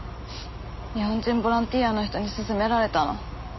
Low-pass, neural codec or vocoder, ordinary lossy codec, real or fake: 7.2 kHz; none; MP3, 24 kbps; real